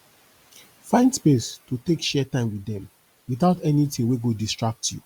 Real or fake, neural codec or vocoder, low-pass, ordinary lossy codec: real; none; 19.8 kHz; Opus, 64 kbps